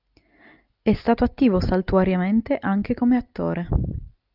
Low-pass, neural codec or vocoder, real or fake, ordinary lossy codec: 5.4 kHz; none; real; Opus, 24 kbps